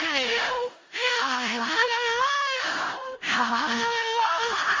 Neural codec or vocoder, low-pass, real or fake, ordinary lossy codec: codec, 16 kHz in and 24 kHz out, 0.4 kbps, LongCat-Audio-Codec, four codebook decoder; 7.2 kHz; fake; Opus, 32 kbps